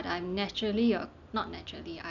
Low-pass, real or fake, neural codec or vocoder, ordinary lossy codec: 7.2 kHz; real; none; none